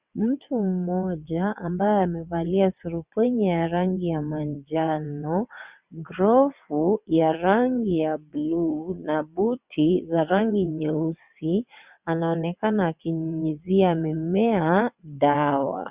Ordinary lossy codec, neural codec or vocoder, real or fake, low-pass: Opus, 64 kbps; vocoder, 22.05 kHz, 80 mel bands, WaveNeXt; fake; 3.6 kHz